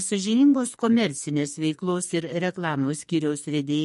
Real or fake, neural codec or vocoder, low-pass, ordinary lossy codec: fake; codec, 44.1 kHz, 2.6 kbps, SNAC; 14.4 kHz; MP3, 48 kbps